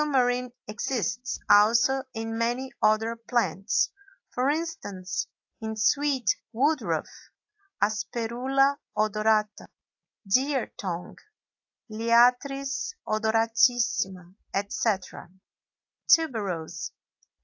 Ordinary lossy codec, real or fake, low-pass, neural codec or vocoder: AAC, 48 kbps; real; 7.2 kHz; none